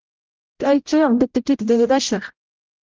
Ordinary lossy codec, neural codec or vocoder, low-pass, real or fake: Opus, 32 kbps; codec, 16 kHz, 0.5 kbps, X-Codec, HuBERT features, trained on general audio; 7.2 kHz; fake